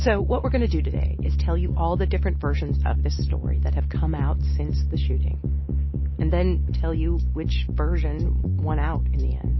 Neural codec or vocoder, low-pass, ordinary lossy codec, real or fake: none; 7.2 kHz; MP3, 24 kbps; real